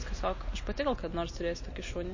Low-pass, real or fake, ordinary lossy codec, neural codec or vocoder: 7.2 kHz; real; MP3, 32 kbps; none